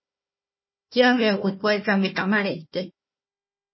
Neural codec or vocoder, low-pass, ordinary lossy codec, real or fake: codec, 16 kHz, 1 kbps, FunCodec, trained on Chinese and English, 50 frames a second; 7.2 kHz; MP3, 24 kbps; fake